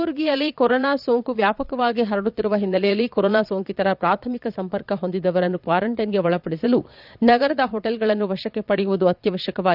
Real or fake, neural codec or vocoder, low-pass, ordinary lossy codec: fake; vocoder, 22.05 kHz, 80 mel bands, Vocos; 5.4 kHz; none